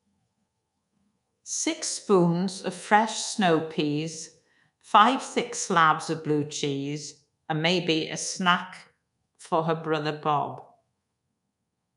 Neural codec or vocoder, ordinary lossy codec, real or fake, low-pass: codec, 24 kHz, 1.2 kbps, DualCodec; none; fake; 10.8 kHz